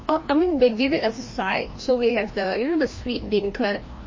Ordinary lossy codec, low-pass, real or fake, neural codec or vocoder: MP3, 32 kbps; 7.2 kHz; fake; codec, 16 kHz, 1 kbps, FreqCodec, larger model